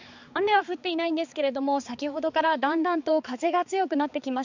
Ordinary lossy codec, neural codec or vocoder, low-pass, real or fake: none; codec, 16 kHz, 4 kbps, X-Codec, HuBERT features, trained on balanced general audio; 7.2 kHz; fake